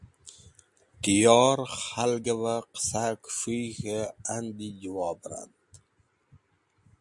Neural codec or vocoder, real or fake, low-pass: none; real; 10.8 kHz